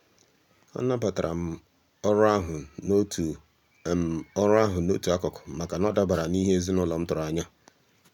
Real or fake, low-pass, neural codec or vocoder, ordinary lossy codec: real; 19.8 kHz; none; none